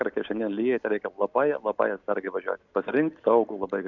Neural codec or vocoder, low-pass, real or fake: none; 7.2 kHz; real